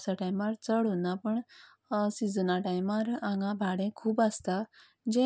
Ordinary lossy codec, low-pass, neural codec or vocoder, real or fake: none; none; none; real